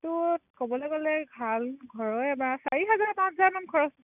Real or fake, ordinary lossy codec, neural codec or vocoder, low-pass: real; none; none; 3.6 kHz